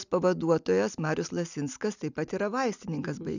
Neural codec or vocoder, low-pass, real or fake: none; 7.2 kHz; real